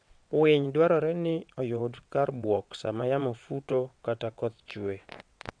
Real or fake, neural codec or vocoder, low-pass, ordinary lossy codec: fake; vocoder, 22.05 kHz, 80 mel bands, WaveNeXt; 9.9 kHz; MP3, 64 kbps